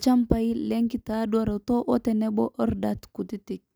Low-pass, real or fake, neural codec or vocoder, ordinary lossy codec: none; real; none; none